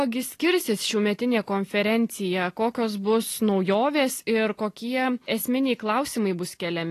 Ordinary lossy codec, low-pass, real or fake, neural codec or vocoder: AAC, 48 kbps; 14.4 kHz; real; none